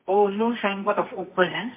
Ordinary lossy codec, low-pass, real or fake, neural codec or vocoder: MP3, 24 kbps; 3.6 kHz; fake; codec, 24 kHz, 0.9 kbps, WavTokenizer, medium music audio release